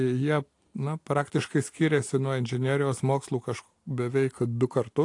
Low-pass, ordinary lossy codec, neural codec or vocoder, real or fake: 10.8 kHz; AAC, 48 kbps; none; real